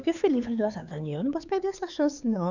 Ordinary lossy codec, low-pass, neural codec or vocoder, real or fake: none; 7.2 kHz; codec, 16 kHz, 4 kbps, X-Codec, HuBERT features, trained on LibriSpeech; fake